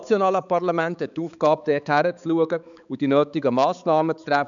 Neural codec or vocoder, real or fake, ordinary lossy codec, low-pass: codec, 16 kHz, 4 kbps, X-Codec, HuBERT features, trained on balanced general audio; fake; none; 7.2 kHz